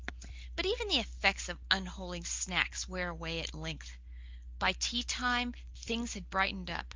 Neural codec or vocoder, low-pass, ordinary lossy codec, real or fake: none; 7.2 kHz; Opus, 24 kbps; real